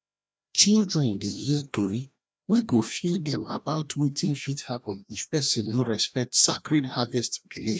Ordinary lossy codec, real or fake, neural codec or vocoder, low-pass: none; fake; codec, 16 kHz, 1 kbps, FreqCodec, larger model; none